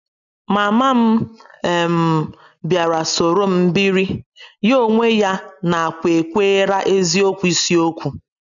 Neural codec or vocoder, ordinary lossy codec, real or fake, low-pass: none; none; real; 7.2 kHz